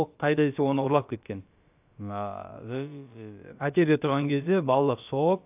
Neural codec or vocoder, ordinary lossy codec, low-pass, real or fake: codec, 16 kHz, about 1 kbps, DyCAST, with the encoder's durations; AAC, 32 kbps; 3.6 kHz; fake